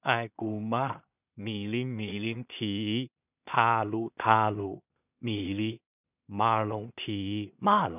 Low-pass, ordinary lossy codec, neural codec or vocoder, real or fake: 3.6 kHz; none; codec, 16 kHz in and 24 kHz out, 0.4 kbps, LongCat-Audio-Codec, two codebook decoder; fake